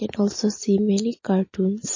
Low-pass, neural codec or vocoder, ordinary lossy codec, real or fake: 7.2 kHz; none; MP3, 32 kbps; real